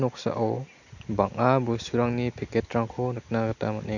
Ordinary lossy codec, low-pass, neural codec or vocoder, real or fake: none; 7.2 kHz; none; real